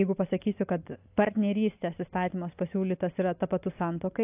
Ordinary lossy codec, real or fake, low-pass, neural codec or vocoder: AAC, 32 kbps; real; 3.6 kHz; none